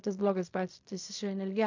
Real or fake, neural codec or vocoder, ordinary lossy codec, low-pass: fake; codec, 16 kHz in and 24 kHz out, 0.4 kbps, LongCat-Audio-Codec, fine tuned four codebook decoder; AAC, 48 kbps; 7.2 kHz